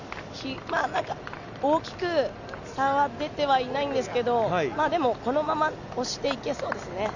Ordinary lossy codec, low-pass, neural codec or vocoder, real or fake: none; 7.2 kHz; none; real